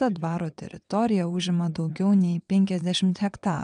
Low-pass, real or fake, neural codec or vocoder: 9.9 kHz; fake; vocoder, 22.05 kHz, 80 mel bands, WaveNeXt